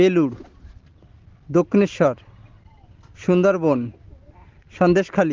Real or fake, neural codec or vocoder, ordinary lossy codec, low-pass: real; none; Opus, 16 kbps; 7.2 kHz